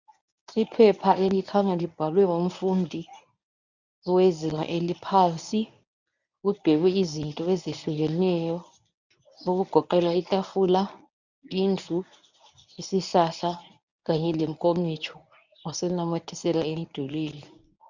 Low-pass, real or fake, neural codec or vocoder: 7.2 kHz; fake; codec, 24 kHz, 0.9 kbps, WavTokenizer, medium speech release version 1